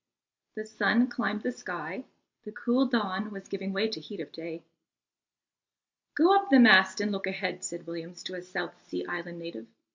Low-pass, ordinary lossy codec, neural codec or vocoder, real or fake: 7.2 kHz; MP3, 48 kbps; none; real